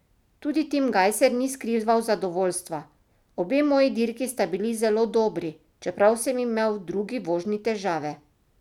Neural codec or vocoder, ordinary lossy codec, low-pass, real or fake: none; none; 19.8 kHz; real